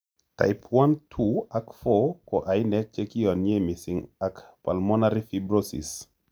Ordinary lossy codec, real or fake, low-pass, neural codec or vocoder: none; real; none; none